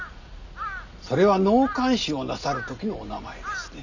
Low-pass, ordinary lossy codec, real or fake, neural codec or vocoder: 7.2 kHz; none; real; none